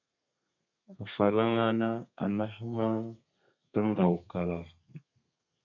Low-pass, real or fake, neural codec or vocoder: 7.2 kHz; fake; codec, 32 kHz, 1.9 kbps, SNAC